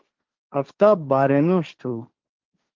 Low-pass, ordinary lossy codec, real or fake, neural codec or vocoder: 7.2 kHz; Opus, 32 kbps; fake; codec, 16 kHz, 1.1 kbps, Voila-Tokenizer